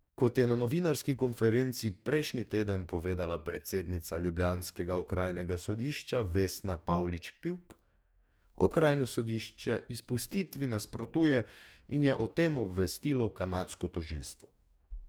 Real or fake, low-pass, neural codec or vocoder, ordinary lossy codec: fake; none; codec, 44.1 kHz, 2.6 kbps, DAC; none